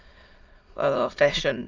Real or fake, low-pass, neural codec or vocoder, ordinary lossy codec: fake; 7.2 kHz; autoencoder, 22.05 kHz, a latent of 192 numbers a frame, VITS, trained on many speakers; Opus, 32 kbps